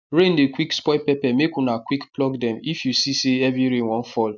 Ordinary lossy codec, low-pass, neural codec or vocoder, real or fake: none; 7.2 kHz; none; real